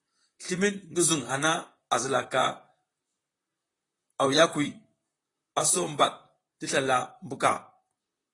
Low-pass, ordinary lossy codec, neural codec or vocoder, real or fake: 10.8 kHz; AAC, 32 kbps; vocoder, 44.1 kHz, 128 mel bands, Pupu-Vocoder; fake